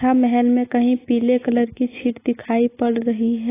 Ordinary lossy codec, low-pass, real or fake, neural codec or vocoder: AAC, 16 kbps; 3.6 kHz; real; none